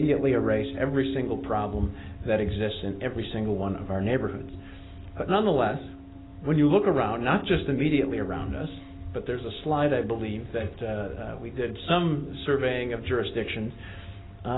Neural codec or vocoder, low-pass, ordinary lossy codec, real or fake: none; 7.2 kHz; AAC, 16 kbps; real